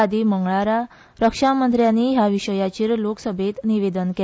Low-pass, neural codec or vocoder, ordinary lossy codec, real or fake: none; none; none; real